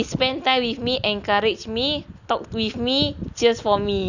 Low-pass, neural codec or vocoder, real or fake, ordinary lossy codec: 7.2 kHz; none; real; none